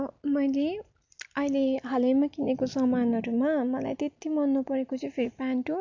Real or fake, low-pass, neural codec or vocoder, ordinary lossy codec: real; 7.2 kHz; none; AAC, 48 kbps